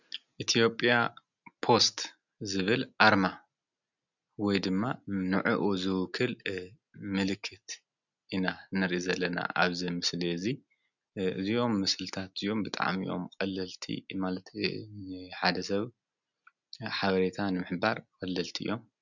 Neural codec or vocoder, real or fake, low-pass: none; real; 7.2 kHz